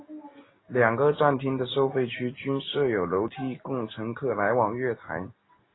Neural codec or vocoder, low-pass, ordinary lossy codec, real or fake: none; 7.2 kHz; AAC, 16 kbps; real